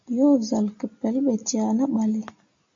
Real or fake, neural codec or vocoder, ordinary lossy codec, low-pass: real; none; MP3, 48 kbps; 7.2 kHz